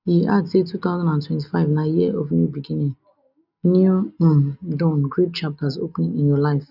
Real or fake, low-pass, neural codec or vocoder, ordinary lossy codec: real; 5.4 kHz; none; none